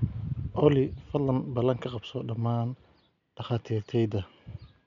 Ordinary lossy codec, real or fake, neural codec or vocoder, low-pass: none; real; none; 7.2 kHz